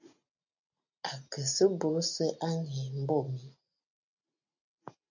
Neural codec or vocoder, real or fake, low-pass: none; real; 7.2 kHz